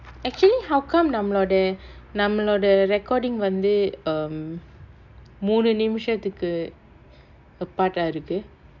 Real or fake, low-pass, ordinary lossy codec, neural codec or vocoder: real; 7.2 kHz; none; none